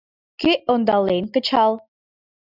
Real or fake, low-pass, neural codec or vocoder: real; 5.4 kHz; none